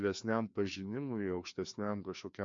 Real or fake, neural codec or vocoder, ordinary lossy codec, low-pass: fake; codec, 16 kHz, 2 kbps, FreqCodec, larger model; MP3, 48 kbps; 7.2 kHz